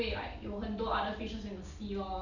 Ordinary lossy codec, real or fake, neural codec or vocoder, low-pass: none; real; none; 7.2 kHz